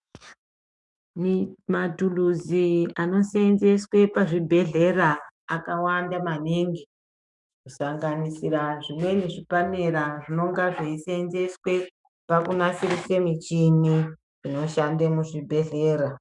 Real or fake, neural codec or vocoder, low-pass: fake; autoencoder, 48 kHz, 128 numbers a frame, DAC-VAE, trained on Japanese speech; 10.8 kHz